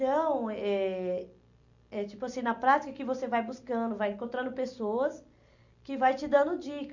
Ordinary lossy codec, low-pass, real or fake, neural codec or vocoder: none; 7.2 kHz; real; none